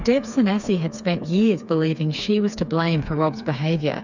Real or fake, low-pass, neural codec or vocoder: fake; 7.2 kHz; codec, 16 kHz, 4 kbps, FreqCodec, smaller model